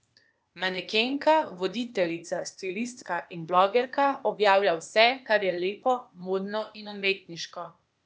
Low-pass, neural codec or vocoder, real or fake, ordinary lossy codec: none; codec, 16 kHz, 0.8 kbps, ZipCodec; fake; none